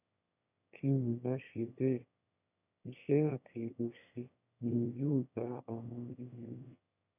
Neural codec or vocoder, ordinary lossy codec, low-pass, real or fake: autoencoder, 22.05 kHz, a latent of 192 numbers a frame, VITS, trained on one speaker; none; 3.6 kHz; fake